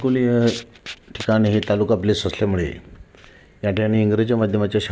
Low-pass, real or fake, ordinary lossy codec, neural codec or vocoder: none; real; none; none